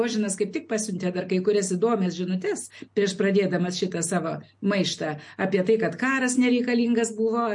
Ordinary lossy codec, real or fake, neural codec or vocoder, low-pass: MP3, 48 kbps; real; none; 10.8 kHz